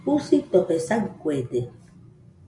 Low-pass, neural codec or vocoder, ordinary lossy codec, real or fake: 10.8 kHz; none; AAC, 64 kbps; real